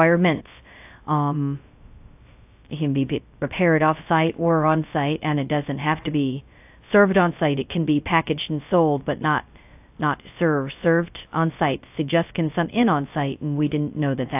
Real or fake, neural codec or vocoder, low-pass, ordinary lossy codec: fake; codec, 16 kHz, 0.2 kbps, FocalCodec; 3.6 kHz; AAC, 32 kbps